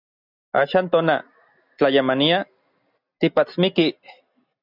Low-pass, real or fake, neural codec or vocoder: 5.4 kHz; real; none